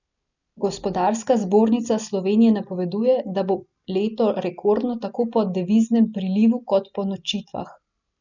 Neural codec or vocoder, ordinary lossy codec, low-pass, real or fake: none; none; 7.2 kHz; real